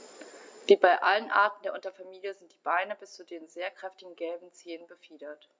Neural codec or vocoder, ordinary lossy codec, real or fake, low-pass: none; none; real; none